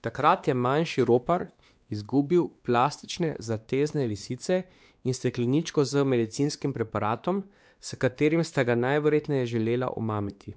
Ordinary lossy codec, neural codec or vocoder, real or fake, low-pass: none; codec, 16 kHz, 2 kbps, X-Codec, WavLM features, trained on Multilingual LibriSpeech; fake; none